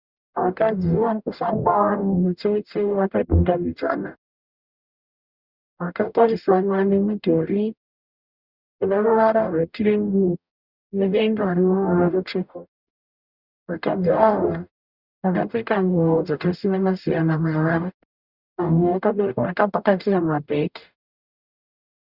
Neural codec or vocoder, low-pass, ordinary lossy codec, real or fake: codec, 44.1 kHz, 0.9 kbps, DAC; 5.4 kHz; Opus, 64 kbps; fake